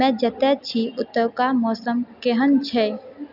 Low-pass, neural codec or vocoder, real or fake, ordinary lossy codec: 5.4 kHz; none; real; none